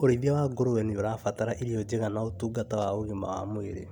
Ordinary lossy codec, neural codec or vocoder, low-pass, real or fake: none; none; 19.8 kHz; real